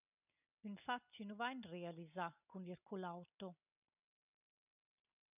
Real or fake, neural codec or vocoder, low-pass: real; none; 3.6 kHz